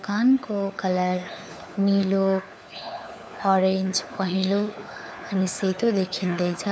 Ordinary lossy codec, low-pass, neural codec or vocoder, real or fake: none; none; codec, 16 kHz, 4 kbps, FunCodec, trained on LibriTTS, 50 frames a second; fake